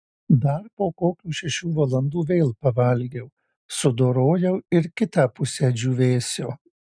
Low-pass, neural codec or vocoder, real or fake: 9.9 kHz; none; real